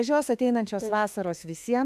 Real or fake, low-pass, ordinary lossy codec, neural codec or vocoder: fake; 14.4 kHz; AAC, 96 kbps; autoencoder, 48 kHz, 32 numbers a frame, DAC-VAE, trained on Japanese speech